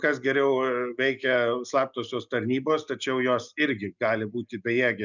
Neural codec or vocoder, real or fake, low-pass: none; real; 7.2 kHz